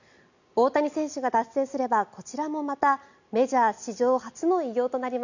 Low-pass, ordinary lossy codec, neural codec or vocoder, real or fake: 7.2 kHz; none; none; real